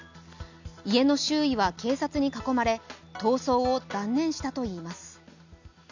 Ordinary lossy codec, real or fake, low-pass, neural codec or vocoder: none; real; 7.2 kHz; none